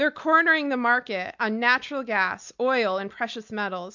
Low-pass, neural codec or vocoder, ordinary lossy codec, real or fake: 7.2 kHz; none; MP3, 64 kbps; real